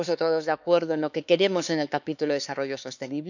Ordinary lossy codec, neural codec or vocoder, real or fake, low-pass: none; codec, 16 kHz, 4 kbps, X-Codec, HuBERT features, trained on LibriSpeech; fake; 7.2 kHz